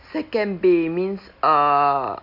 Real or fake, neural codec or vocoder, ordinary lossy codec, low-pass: real; none; AAC, 48 kbps; 5.4 kHz